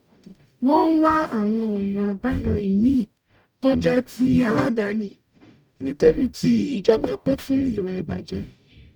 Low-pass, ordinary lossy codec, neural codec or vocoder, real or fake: 19.8 kHz; none; codec, 44.1 kHz, 0.9 kbps, DAC; fake